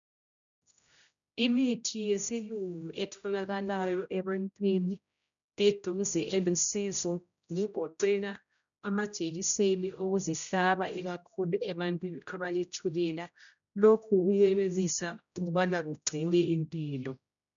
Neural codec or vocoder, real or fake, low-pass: codec, 16 kHz, 0.5 kbps, X-Codec, HuBERT features, trained on general audio; fake; 7.2 kHz